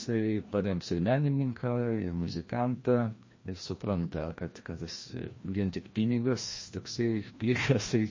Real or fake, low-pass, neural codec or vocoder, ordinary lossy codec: fake; 7.2 kHz; codec, 16 kHz, 1 kbps, FreqCodec, larger model; MP3, 32 kbps